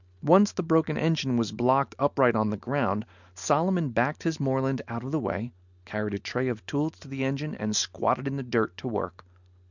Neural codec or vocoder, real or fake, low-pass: none; real; 7.2 kHz